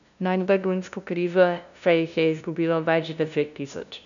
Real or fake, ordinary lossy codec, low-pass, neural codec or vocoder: fake; none; 7.2 kHz; codec, 16 kHz, 0.5 kbps, FunCodec, trained on LibriTTS, 25 frames a second